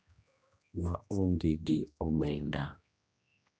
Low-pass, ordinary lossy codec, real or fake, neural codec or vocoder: none; none; fake; codec, 16 kHz, 1 kbps, X-Codec, HuBERT features, trained on general audio